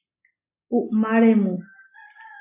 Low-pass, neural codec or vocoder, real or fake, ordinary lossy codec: 3.6 kHz; none; real; MP3, 16 kbps